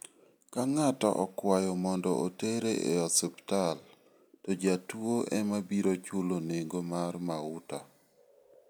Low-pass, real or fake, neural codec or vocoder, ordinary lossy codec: none; real; none; none